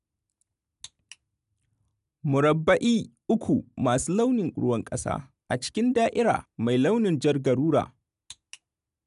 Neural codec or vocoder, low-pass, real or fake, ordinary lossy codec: none; 10.8 kHz; real; none